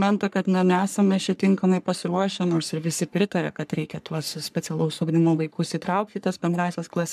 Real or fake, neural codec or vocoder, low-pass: fake; codec, 44.1 kHz, 3.4 kbps, Pupu-Codec; 14.4 kHz